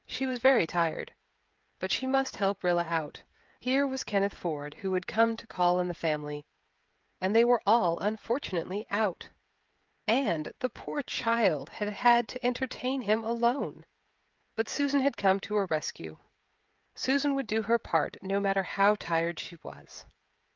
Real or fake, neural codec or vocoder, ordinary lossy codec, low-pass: fake; codec, 16 kHz, 16 kbps, FreqCodec, smaller model; Opus, 24 kbps; 7.2 kHz